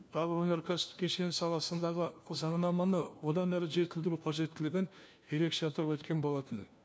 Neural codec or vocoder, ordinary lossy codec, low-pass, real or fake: codec, 16 kHz, 1 kbps, FunCodec, trained on LibriTTS, 50 frames a second; none; none; fake